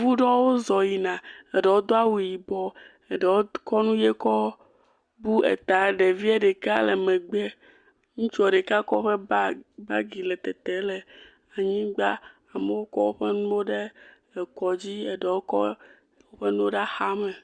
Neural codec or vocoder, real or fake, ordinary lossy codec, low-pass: none; real; Opus, 64 kbps; 9.9 kHz